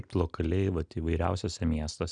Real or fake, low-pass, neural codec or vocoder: real; 9.9 kHz; none